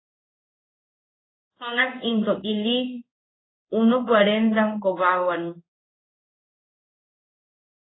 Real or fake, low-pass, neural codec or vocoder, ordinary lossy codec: fake; 7.2 kHz; codec, 16 kHz in and 24 kHz out, 1 kbps, XY-Tokenizer; AAC, 16 kbps